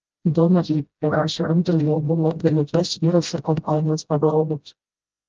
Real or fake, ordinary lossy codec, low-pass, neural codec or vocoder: fake; Opus, 24 kbps; 7.2 kHz; codec, 16 kHz, 0.5 kbps, FreqCodec, smaller model